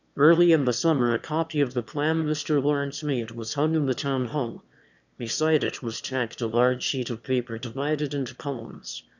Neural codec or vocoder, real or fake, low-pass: autoencoder, 22.05 kHz, a latent of 192 numbers a frame, VITS, trained on one speaker; fake; 7.2 kHz